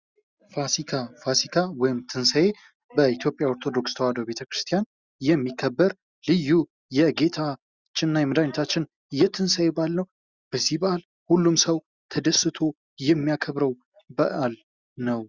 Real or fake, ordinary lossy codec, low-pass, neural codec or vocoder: real; Opus, 64 kbps; 7.2 kHz; none